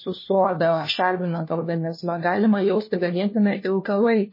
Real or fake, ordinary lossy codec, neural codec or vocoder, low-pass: fake; MP3, 24 kbps; codec, 16 kHz, 1 kbps, FunCodec, trained on LibriTTS, 50 frames a second; 5.4 kHz